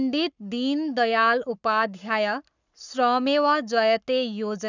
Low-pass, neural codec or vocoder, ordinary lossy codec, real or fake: 7.2 kHz; codec, 44.1 kHz, 7.8 kbps, Pupu-Codec; none; fake